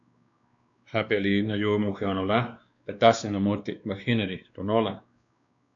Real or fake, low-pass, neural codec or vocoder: fake; 7.2 kHz; codec, 16 kHz, 2 kbps, X-Codec, WavLM features, trained on Multilingual LibriSpeech